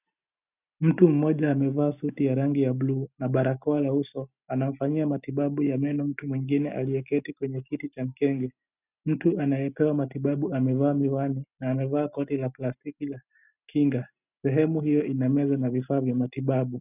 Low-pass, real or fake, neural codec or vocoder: 3.6 kHz; real; none